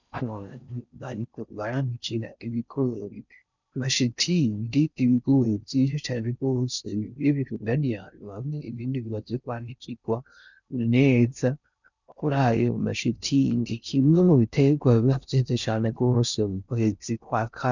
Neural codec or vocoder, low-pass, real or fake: codec, 16 kHz in and 24 kHz out, 0.6 kbps, FocalCodec, streaming, 4096 codes; 7.2 kHz; fake